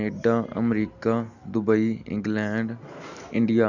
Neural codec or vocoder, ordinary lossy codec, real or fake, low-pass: none; none; real; 7.2 kHz